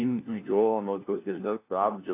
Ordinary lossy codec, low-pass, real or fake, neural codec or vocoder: AAC, 24 kbps; 3.6 kHz; fake; codec, 16 kHz, 1 kbps, FunCodec, trained on LibriTTS, 50 frames a second